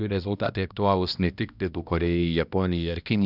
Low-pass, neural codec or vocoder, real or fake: 5.4 kHz; codec, 16 kHz, 1 kbps, X-Codec, HuBERT features, trained on balanced general audio; fake